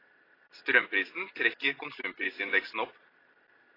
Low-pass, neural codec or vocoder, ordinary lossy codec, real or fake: 5.4 kHz; none; AAC, 24 kbps; real